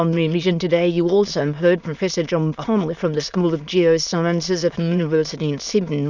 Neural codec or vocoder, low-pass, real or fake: autoencoder, 22.05 kHz, a latent of 192 numbers a frame, VITS, trained on many speakers; 7.2 kHz; fake